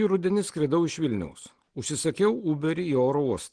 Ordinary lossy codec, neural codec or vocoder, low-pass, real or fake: Opus, 16 kbps; none; 9.9 kHz; real